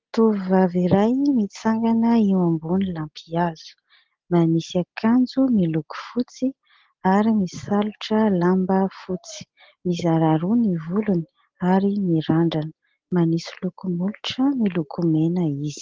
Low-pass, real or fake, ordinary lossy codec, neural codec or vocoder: 7.2 kHz; real; Opus, 16 kbps; none